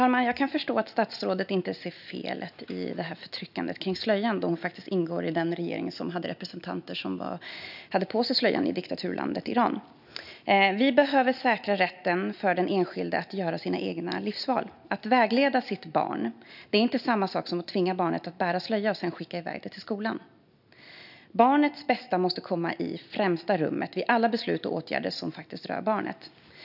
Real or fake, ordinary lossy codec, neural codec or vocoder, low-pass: real; none; none; 5.4 kHz